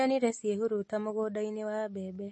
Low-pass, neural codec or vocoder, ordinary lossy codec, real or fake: 10.8 kHz; none; MP3, 32 kbps; real